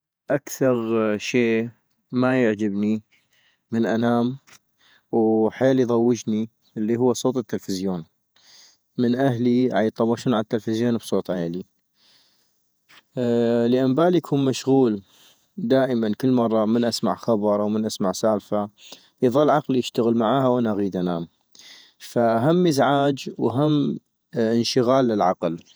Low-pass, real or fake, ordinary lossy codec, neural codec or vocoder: none; fake; none; vocoder, 48 kHz, 128 mel bands, Vocos